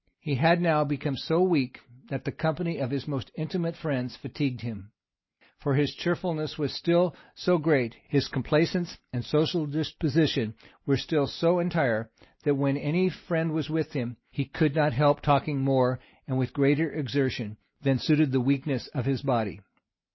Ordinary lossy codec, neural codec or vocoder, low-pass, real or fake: MP3, 24 kbps; none; 7.2 kHz; real